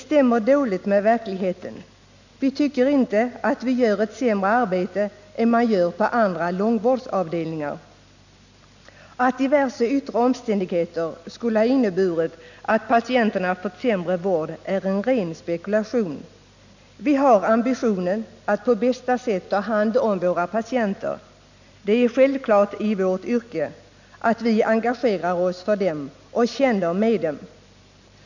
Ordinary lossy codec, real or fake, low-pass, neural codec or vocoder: none; real; 7.2 kHz; none